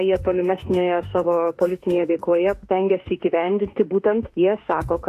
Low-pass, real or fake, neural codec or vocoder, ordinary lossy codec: 14.4 kHz; fake; autoencoder, 48 kHz, 32 numbers a frame, DAC-VAE, trained on Japanese speech; AAC, 64 kbps